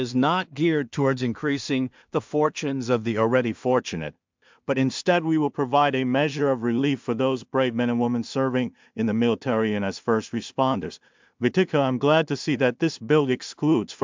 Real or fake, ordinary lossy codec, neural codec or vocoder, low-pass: fake; MP3, 64 kbps; codec, 16 kHz in and 24 kHz out, 0.4 kbps, LongCat-Audio-Codec, two codebook decoder; 7.2 kHz